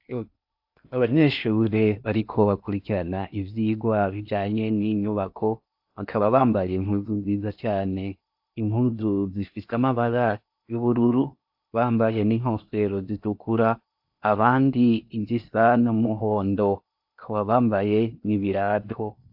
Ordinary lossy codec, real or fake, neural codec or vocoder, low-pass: AAC, 48 kbps; fake; codec, 16 kHz in and 24 kHz out, 0.8 kbps, FocalCodec, streaming, 65536 codes; 5.4 kHz